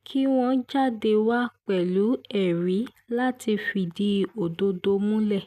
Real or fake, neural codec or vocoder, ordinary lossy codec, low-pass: real; none; none; 14.4 kHz